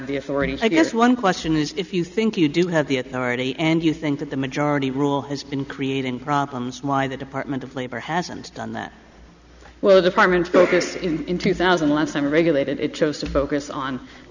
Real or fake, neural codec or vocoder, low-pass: real; none; 7.2 kHz